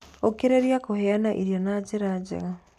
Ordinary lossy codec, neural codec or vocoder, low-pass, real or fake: none; none; 14.4 kHz; real